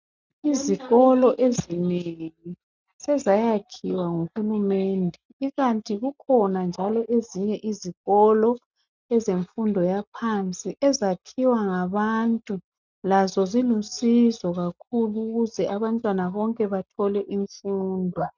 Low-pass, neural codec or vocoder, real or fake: 7.2 kHz; none; real